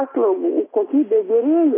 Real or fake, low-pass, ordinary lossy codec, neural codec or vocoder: real; 3.6 kHz; AAC, 16 kbps; none